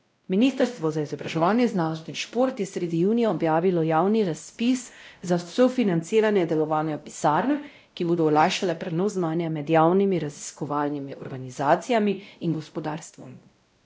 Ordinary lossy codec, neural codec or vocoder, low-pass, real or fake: none; codec, 16 kHz, 0.5 kbps, X-Codec, WavLM features, trained on Multilingual LibriSpeech; none; fake